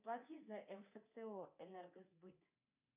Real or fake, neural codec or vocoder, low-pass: fake; codec, 24 kHz, 3.1 kbps, DualCodec; 3.6 kHz